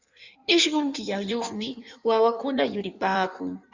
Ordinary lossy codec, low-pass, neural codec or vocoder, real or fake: Opus, 64 kbps; 7.2 kHz; codec, 16 kHz in and 24 kHz out, 1.1 kbps, FireRedTTS-2 codec; fake